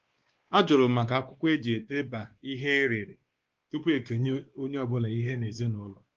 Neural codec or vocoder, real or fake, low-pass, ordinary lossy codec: codec, 16 kHz, 2 kbps, X-Codec, WavLM features, trained on Multilingual LibriSpeech; fake; 7.2 kHz; Opus, 16 kbps